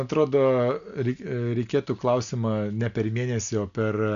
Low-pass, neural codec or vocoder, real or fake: 7.2 kHz; none; real